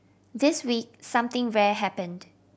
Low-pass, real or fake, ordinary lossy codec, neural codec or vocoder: none; real; none; none